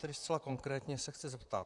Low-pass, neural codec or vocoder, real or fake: 10.8 kHz; vocoder, 44.1 kHz, 128 mel bands, Pupu-Vocoder; fake